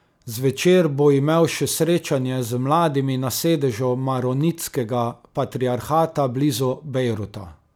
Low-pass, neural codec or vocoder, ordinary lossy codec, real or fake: none; none; none; real